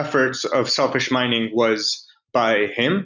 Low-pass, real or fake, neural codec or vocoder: 7.2 kHz; real; none